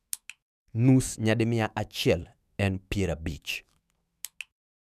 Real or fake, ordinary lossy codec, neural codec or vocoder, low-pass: fake; none; autoencoder, 48 kHz, 128 numbers a frame, DAC-VAE, trained on Japanese speech; 14.4 kHz